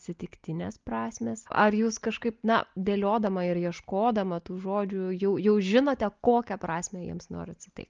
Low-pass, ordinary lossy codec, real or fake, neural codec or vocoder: 7.2 kHz; Opus, 32 kbps; real; none